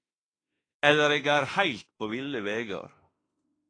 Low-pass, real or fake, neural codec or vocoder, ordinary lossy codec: 9.9 kHz; fake; autoencoder, 48 kHz, 32 numbers a frame, DAC-VAE, trained on Japanese speech; AAC, 32 kbps